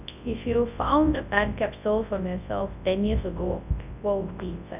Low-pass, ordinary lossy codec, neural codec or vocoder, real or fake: 3.6 kHz; none; codec, 24 kHz, 0.9 kbps, WavTokenizer, large speech release; fake